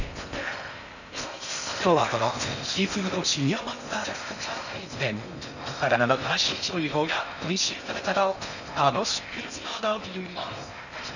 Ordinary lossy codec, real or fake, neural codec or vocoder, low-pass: none; fake; codec, 16 kHz in and 24 kHz out, 0.6 kbps, FocalCodec, streaming, 4096 codes; 7.2 kHz